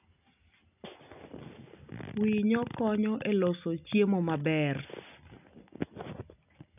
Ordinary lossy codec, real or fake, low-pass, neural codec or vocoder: none; real; 3.6 kHz; none